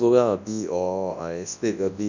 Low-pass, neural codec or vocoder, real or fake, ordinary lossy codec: 7.2 kHz; codec, 24 kHz, 0.9 kbps, WavTokenizer, large speech release; fake; none